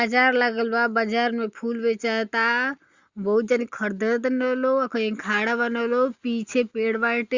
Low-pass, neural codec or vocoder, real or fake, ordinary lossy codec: 7.2 kHz; none; real; Opus, 64 kbps